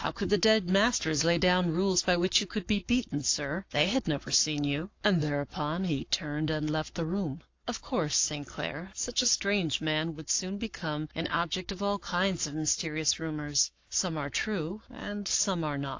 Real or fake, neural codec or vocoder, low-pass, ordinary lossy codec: fake; codec, 44.1 kHz, 7.8 kbps, Pupu-Codec; 7.2 kHz; AAC, 48 kbps